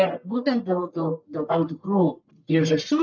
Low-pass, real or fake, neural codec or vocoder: 7.2 kHz; fake; codec, 44.1 kHz, 1.7 kbps, Pupu-Codec